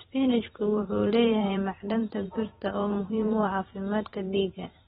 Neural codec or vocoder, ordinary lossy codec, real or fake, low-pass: vocoder, 44.1 kHz, 128 mel bands every 512 samples, BigVGAN v2; AAC, 16 kbps; fake; 19.8 kHz